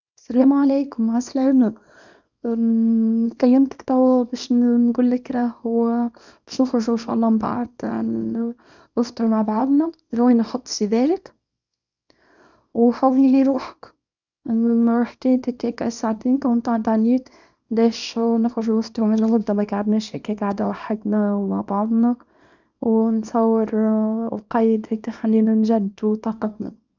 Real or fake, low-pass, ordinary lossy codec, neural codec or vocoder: fake; 7.2 kHz; none; codec, 24 kHz, 0.9 kbps, WavTokenizer, small release